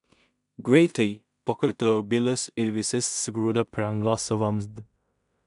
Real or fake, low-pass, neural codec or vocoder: fake; 10.8 kHz; codec, 16 kHz in and 24 kHz out, 0.4 kbps, LongCat-Audio-Codec, two codebook decoder